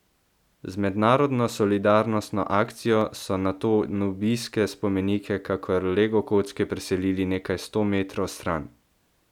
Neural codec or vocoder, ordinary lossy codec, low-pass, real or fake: none; none; 19.8 kHz; real